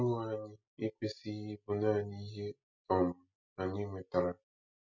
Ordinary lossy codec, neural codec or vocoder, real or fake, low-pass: none; none; real; 7.2 kHz